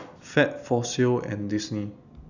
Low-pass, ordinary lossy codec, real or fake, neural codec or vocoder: 7.2 kHz; none; real; none